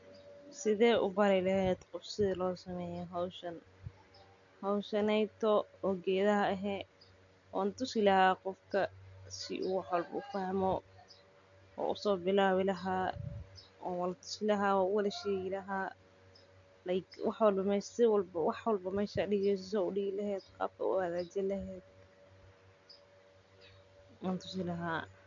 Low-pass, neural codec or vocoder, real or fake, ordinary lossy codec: 7.2 kHz; none; real; none